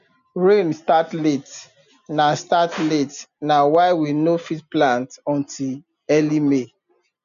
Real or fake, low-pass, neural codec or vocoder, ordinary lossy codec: real; 7.2 kHz; none; none